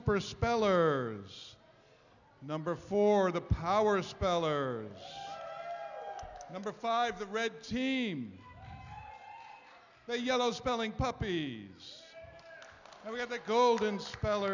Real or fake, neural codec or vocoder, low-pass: real; none; 7.2 kHz